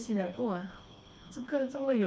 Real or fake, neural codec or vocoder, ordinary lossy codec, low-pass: fake; codec, 16 kHz, 2 kbps, FreqCodec, smaller model; none; none